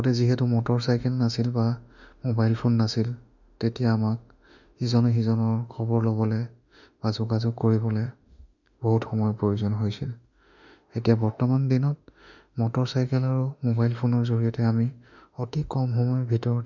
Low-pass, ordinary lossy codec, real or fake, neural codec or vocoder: 7.2 kHz; none; fake; autoencoder, 48 kHz, 32 numbers a frame, DAC-VAE, trained on Japanese speech